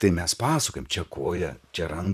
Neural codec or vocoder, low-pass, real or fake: vocoder, 44.1 kHz, 128 mel bands, Pupu-Vocoder; 14.4 kHz; fake